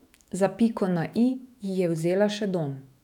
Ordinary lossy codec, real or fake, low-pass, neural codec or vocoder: none; fake; 19.8 kHz; autoencoder, 48 kHz, 128 numbers a frame, DAC-VAE, trained on Japanese speech